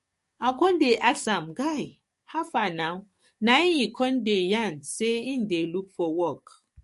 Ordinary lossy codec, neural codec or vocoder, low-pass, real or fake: MP3, 48 kbps; codec, 44.1 kHz, 7.8 kbps, DAC; 14.4 kHz; fake